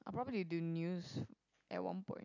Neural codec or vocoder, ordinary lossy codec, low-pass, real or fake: none; none; 7.2 kHz; real